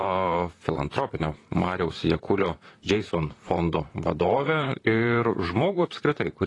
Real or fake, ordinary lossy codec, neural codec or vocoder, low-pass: fake; AAC, 32 kbps; vocoder, 44.1 kHz, 128 mel bands, Pupu-Vocoder; 10.8 kHz